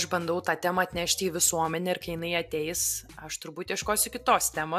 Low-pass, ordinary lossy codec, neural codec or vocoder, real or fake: 14.4 kHz; AAC, 96 kbps; none; real